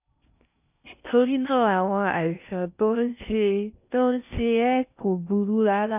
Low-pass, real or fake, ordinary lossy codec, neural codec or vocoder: 3.6 kHz; fake; none; codec, 16 kHz in and 24 kHz out, 0.6 kbps, FocalCodec, streaming, 4096 codes